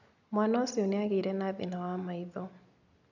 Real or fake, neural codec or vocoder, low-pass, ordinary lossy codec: real; none; 7.2 kHz; none